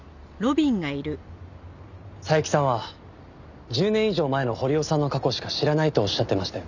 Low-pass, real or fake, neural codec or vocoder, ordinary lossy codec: 7.2 kHz; real; none; none